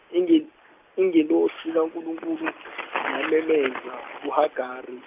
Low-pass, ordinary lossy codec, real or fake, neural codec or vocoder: 3.6 kHz; none; real; none